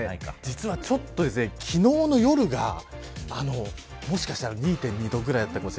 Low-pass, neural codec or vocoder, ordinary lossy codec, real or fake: none; none; none; real